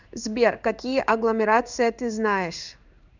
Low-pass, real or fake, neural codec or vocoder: 7.2 kHz; fake; codec, 16 kHz in and 24 kHz out, 1 kbps, XY-Tokenizer